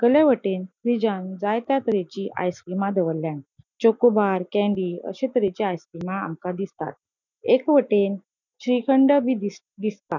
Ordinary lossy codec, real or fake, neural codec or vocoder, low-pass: none; real; none; 7.2 kHz